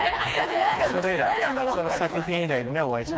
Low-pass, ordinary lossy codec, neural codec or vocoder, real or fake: none; none; codec, 16 kHz, 2 kbps, FreqCodec, smaller model; fake